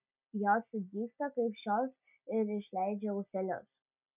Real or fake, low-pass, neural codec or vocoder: real; 3.6 kHz; none